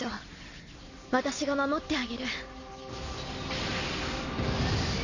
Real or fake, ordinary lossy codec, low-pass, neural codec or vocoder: real; none; 7.2 kHz; none